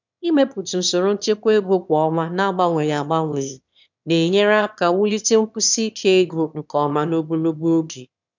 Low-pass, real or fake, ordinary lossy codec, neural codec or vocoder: 7.2 kHz; fake; none; autoencoder, 22.05 kHz, a latent of 192 numbers a frame, VITS, trained on one speaker